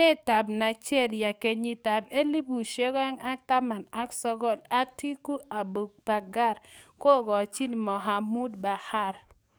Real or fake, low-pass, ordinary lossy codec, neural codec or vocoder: fake; none; none; codec, 44.1 kHz, 7.8 kbps, DAC